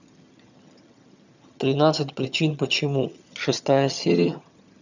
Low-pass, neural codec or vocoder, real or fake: 7.2 kHz; vocoder, 22.05 kHz, 80 mel bands, HiFi-GAN; fake